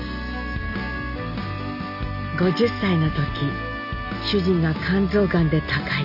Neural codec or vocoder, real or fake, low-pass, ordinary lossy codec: none; real; 5.4 kHz; none